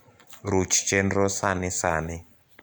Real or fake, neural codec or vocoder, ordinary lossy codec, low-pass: real; none; none; none